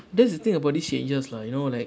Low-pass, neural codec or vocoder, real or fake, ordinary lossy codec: none; none; real; none